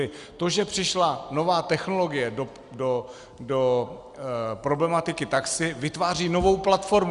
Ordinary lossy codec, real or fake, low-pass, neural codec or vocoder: Opus, 64 kbps; real; 10.8 kHz; none